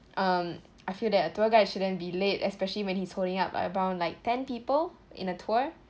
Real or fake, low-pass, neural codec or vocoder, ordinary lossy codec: real; none; none; none